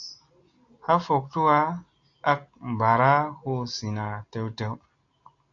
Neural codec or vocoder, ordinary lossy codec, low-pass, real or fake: none; AAC, 48 kbps; 7.2 kHz; real